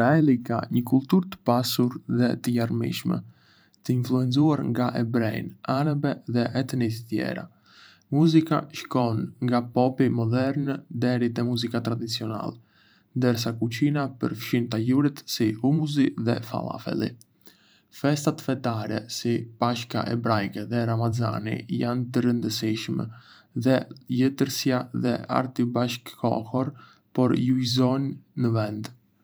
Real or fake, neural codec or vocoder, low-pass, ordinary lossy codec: fake; vocoder, 44.1 kHz, 128 mel bands every 512 samples, BigVGAN v2; none; none